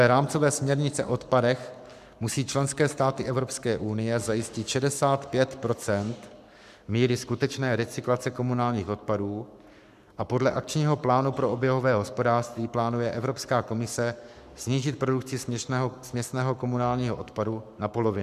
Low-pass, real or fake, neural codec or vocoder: 14.4 kHz; fake; codec, 44.1 kHz, 7.8 kbps, Pupu-Codec